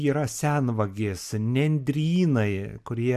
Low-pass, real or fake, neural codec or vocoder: 14.4 kHz; real; none